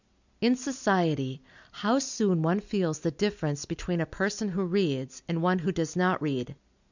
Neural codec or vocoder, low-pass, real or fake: none; 7.2 kHz; real